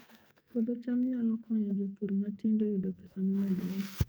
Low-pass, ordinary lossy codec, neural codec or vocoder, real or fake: none; none; codec, 44.1 kHz, 2.6 kbps, SNAC; fake